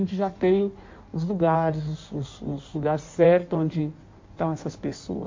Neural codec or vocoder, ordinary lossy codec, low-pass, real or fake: codec, 16 kHz in and 24 kHz out, 1.1 kbps, FireRedTTS-2 codec; MP3, 48 kbps; 7.2 kHz; fake